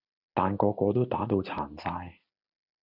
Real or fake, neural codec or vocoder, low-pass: real; none; 5.4 kHz